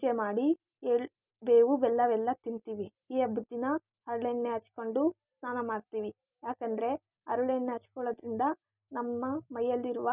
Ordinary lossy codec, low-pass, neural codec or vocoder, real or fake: none; 3.6 kHz; none; real